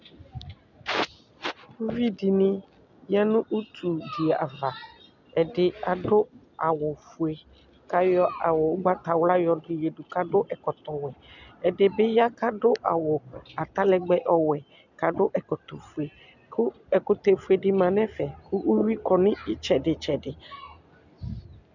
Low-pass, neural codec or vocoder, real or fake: 7.2 kHz; none; real